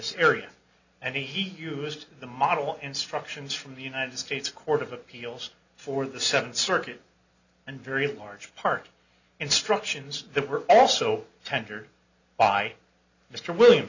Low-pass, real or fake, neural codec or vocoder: 7.2 kHz; real; none